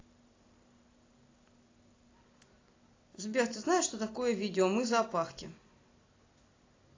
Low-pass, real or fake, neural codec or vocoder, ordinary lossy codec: 7.2 kHz; real; none; AAC, 32 kbps